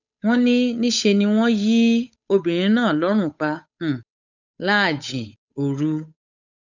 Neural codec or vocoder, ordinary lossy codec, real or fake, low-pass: codec, 16 kHz, 8 kbps, FunCodec, trained on Chinese and English, 25 frames a second; none; fake; 7.2 kHz